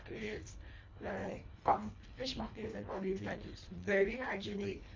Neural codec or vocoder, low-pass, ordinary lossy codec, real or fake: codec, 24 kHz, 1.5 kbps, HILCodec; 7.2 kHz; MP3, 48 kbps; fake